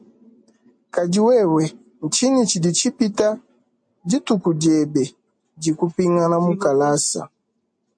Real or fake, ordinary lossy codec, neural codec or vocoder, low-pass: real; MP3, 48 kbps; none; 9.9 kHz